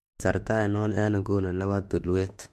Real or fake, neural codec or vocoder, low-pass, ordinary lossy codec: fake; autoencoder, 48 kHz, 32 numbers a frame, DAC-VAE, trained on Japanese speech; 14.4 kHz; MP3, 64 kbps